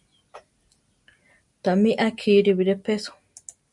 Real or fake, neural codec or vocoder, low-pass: real; none; 10.8 kHz